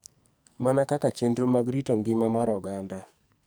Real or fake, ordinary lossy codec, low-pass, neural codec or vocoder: fake; none; none; codec, 44.1 kHz, 2.6 kbps, SNAC